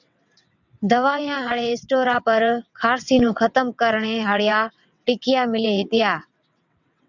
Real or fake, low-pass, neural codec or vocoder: fake; 7.2 kHz; vocoder, 22.05 kHz, 80 mel bands, WaveNeXt